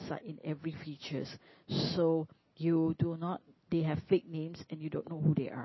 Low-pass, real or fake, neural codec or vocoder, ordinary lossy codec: 7.2 kHz; real; none; MP3, 24 kbps